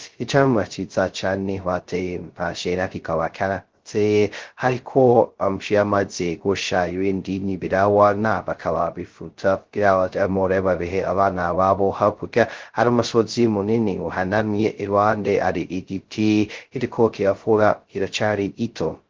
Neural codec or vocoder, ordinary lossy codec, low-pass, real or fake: codec, 16 kHz, 0.2 kbps, FocalCodec; Opus, 16 kbps; 7.2 kHz; fake